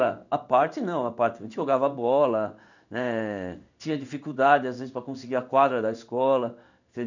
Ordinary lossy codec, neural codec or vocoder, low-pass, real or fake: none; codec, 16 kHz in and 24 kHz out, 1 kbps, XY-Tokenizer; 7.2 kHz; fake